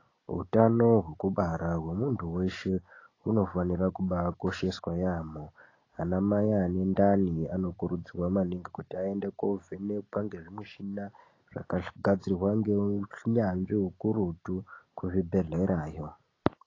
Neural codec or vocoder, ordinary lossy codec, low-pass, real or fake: none; AAC, 32 kbps; 7.2 kHz; real